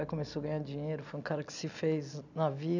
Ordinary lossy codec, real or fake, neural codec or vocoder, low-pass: none; real; none; 7.2 kHz